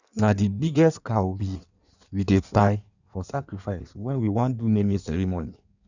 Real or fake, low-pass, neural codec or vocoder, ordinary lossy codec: fake; 7.2 kHz; codec, 16 kHz in and 24 kHz out, 1.1 kbps, FireRedTTS-2 codec; none